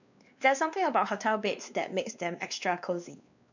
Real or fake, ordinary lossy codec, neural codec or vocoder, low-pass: fake; none; codec, 16 kHz, 2 kbps, X-Codec, WavLM features, trained on Multilingual LibriSpeech; 7.2 kHz